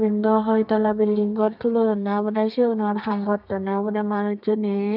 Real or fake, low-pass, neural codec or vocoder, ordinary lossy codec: fake; 5.4 kHz; codec, 32 kHz, 1.9 kbps, SNAC; none